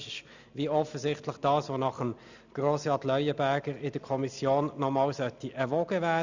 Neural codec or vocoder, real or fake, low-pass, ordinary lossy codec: none; real; 7.2 kHz; none